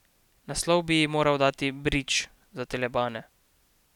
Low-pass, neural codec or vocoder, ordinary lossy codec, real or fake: 19.8 kHz; none; none; real